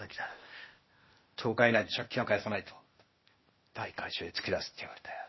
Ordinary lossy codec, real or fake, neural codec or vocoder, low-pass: MP3, 24 kbps; fake; codec, 16 kHz, 0.8 kbps, ZipCodec; 7.2 kHz